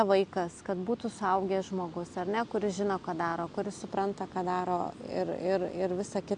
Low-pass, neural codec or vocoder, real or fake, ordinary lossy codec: 9.9 kHz; none; real; AAC, 64 kbps